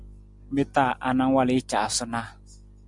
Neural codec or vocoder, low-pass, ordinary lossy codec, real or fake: none; 10.8 kHz; MP3, 96 kbps; real